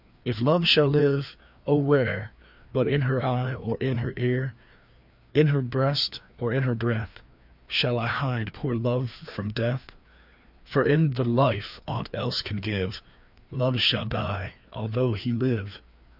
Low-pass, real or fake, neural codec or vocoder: 5.4 kHz; fake; codec, 16 kHz, 2 kbps, FreqCodec, larger model